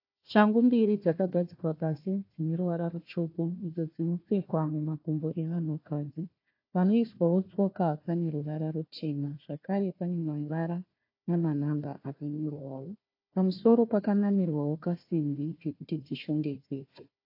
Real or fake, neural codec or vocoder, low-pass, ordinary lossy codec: fake; codec, 16 kHz, 1 kbps, FunCodec, trained on Chinese and English, 50 frames a second; 5.4 kHz; AAC, 32 kbps